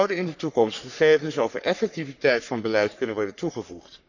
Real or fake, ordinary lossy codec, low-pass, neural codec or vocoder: fake; none; 7.2 kHz; codec, 44.1 kHz, 3.4 kbps, Pupu-Codec